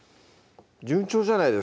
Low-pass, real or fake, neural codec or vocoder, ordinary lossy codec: none; real; none; none